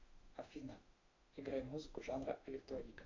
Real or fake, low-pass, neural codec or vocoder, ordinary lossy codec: fake; 7.2 kHz; autoencoder, 48 kHz, 32 numbers a frame, DAC-VAE, trained on Japanese speech; MP3, 64 kbps